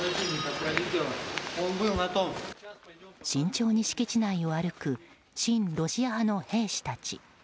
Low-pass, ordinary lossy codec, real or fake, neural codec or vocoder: none; none; real; none